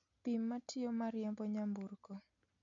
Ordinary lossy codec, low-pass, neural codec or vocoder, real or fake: MP3, 64 kbps; 7.2 kHz; none; real